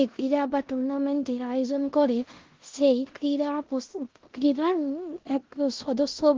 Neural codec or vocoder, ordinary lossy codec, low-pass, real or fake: codec, 16 kHz in and 24 kHz out, 0.9 kbps, LongCat-Audio-Codec, four codebook decoder; Opus, 16 kbps; 7.2 kHz; fake